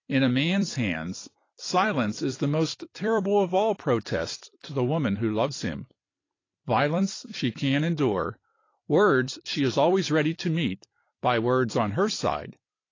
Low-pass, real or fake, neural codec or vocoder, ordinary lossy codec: 7.2 kHz; fake; vocoder, 44.1 kHz, 128 mel bands every 256 samples, BigVGAN v2; AAC, 32 kbps